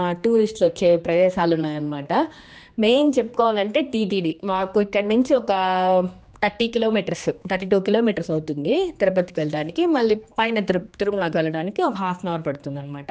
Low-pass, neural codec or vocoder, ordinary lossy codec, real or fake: none; codec, 16 kHz, 2 kbps, X-Codec, HuBERT features, trained on general audio; none; fake